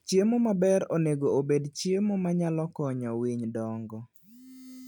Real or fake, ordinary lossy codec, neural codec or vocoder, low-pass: real; none; none; 19.8 kHz